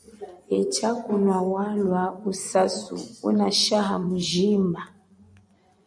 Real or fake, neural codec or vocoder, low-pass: fake; vocoder, 24 kHz, 100 mel bands, Vocos; 9.9 kHz